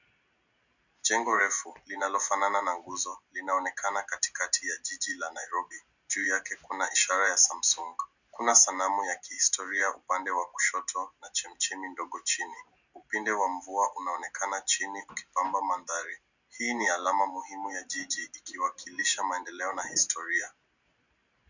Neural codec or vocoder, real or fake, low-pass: none; real; 7.2 kHz